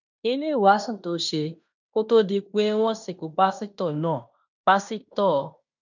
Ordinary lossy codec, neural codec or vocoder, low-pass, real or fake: none; codec, 16 kHz in and 24 kHz out, 0.9 kbps, LongCat-Audio-Codec, fine tuned four codebook decoder; 7.2 kHz; fake